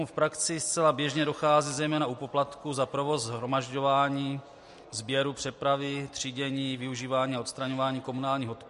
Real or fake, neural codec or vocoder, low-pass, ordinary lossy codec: real; none; 14.4 kHz; MP3, 48 kbps